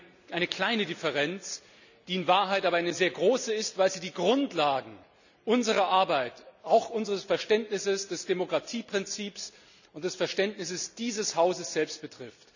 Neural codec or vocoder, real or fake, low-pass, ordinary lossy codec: none; real; 7.2 kHz; none